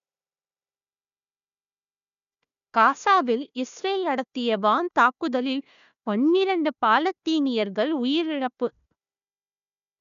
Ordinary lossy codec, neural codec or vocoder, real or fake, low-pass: none; codec, 16 kHz, 1 kbps, FunCodec, trained on Chinese and English, 50 frames a second; fake; 7.2 kHz